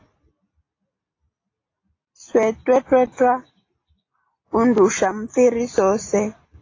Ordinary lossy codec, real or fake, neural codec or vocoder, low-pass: AAC, 32 kbps; real; none; 7.2 kHz